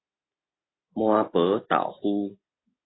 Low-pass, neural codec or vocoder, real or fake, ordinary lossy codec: 7.2 kHz; none; real; AAC, 16 kbps